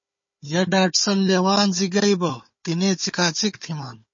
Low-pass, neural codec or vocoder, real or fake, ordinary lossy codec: 7.2 kHz; codec, 16 kHz, 4 kbps, FunCodec, trained on Chinese and English, 50 frames a second; fake; MP3, 32 kbps